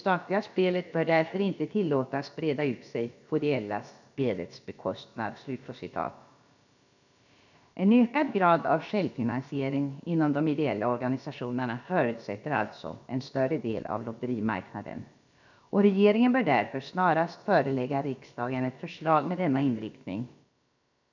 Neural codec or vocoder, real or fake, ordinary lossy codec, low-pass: codec, 16 kHz, about 1 kbps, DyCAST, with the encoder's durations; fake; none; 7.2 kHz